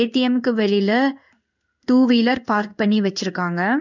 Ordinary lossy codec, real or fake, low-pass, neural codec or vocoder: none; fake; 7.2 kHz; codec, 16 kHz in and 24 kHz out, 1 kbps, XY-Tokenizer